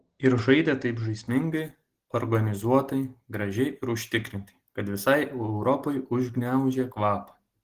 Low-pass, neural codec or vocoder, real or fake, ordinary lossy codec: 14.4 kHz; none; real; Opus, 16 kbps